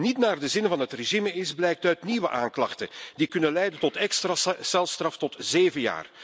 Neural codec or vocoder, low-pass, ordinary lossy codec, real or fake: none; none; none; real